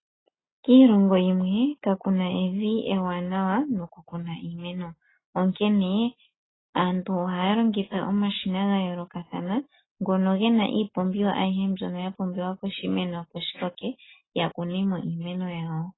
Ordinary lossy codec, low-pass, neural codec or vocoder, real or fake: AAC, 16 kbps; 7.2 kHz; none; real